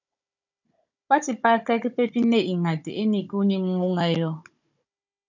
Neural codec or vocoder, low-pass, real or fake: codec, 16 kHz, 16 kbps, FunCodec, trained on Chinese and English, 50 frames a second; 7.2 kHz; fake